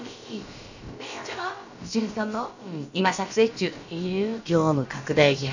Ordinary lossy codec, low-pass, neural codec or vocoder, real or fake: none; 7.2 kHz; codec, 16 kHz, about 1 kbps, DyCAST, with the encoder's durations; fake